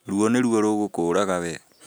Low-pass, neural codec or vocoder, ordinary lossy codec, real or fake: none; none; none; real